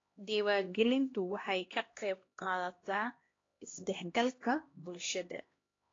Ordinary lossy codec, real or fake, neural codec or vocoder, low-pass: AAC, 32 kbps; fake; codec, 16 kHz, 1 kbps, X-Codec, HuBERT features, trained on LibriSpeech; 7.2 kHz